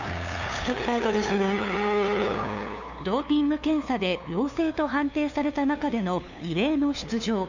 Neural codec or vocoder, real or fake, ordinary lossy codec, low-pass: codec, 16 kHz, 2 kbps, FunCodec, trained on LibriTTS, 25 frames a second; fake; none; 7.2 kHz